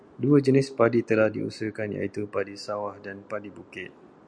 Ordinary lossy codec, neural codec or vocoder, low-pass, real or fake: MP3, 96 kbps; none; 9.9 kHz; real